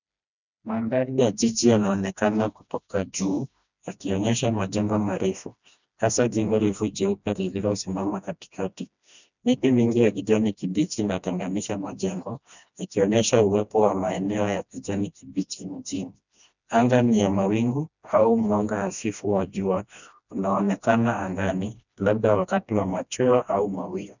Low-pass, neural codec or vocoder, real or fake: 7.2 kHz; codec, 16 kHz, 1 kbps, FreqCodec, smaller model; fake